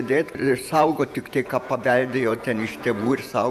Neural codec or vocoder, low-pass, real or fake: vocoder, 48 kHz, 128 mel bands, Vocos; 14.4 kHz; fake